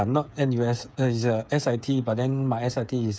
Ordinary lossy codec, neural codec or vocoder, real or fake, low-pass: none; codec, 16 kHz, 8 kbps, FreqCodec, smaller model; fake; none